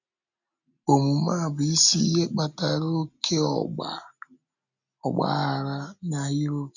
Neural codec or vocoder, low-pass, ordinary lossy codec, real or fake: none; 7.2 kHz; none; real